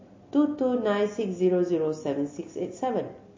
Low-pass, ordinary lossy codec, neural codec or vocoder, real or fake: 7.2 kHz; MP3, 32 kbps; none; real